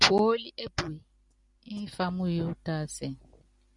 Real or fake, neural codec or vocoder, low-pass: real; none; 10.8 kHz